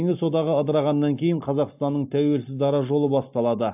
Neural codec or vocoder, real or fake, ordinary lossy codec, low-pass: none; real; AAC, 32 kbps; 3.6 kHz